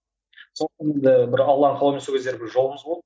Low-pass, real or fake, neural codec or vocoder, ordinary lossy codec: none; real; none; none